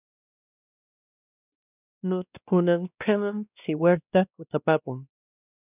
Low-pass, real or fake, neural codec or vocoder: 3.6 kHz; fake; codec, 16 kHz, 1 kbps, X-Codec, WavLM features, trained on Multilingual LibriSpeech